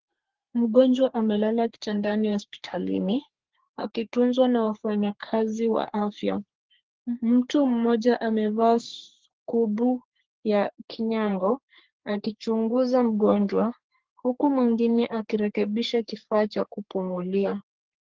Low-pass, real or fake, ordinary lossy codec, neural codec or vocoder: 7.2 kHz; fake; Opus, 16 kbps; codec, 44.1 kHz, 3.4 kbps, Pupu-Codec